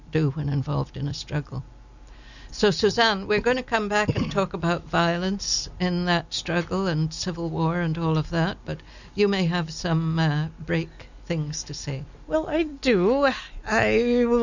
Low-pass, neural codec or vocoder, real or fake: 7.2 kHz; none; real